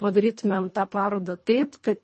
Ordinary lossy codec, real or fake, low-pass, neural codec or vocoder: MP3, 32 kbps; fake; 10.8 kHz; codec, 24 kHz, 1.5 kbps, HILCodec